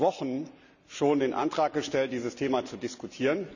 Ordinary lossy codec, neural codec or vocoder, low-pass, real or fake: none; none; 7.2 kHz; real